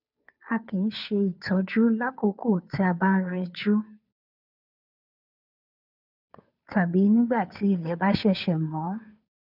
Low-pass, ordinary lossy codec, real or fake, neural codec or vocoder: 5.4 kHz; none; fake; codec, 16 kHz, 2 kbps, FunCodec, trained on Chinese and English, 25 frames a second